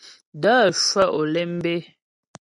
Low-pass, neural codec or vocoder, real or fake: 10.8 kHz; none; real